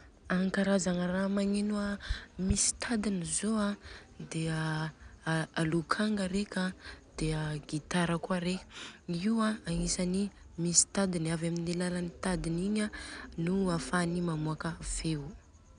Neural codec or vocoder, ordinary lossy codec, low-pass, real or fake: none; none; 9.9 kHz; real